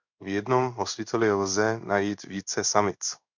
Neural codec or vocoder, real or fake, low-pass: codec, 16 kHz in and 24 kHz out, 1 kbps, XY-Tokenizer; fake; 7.2 kHz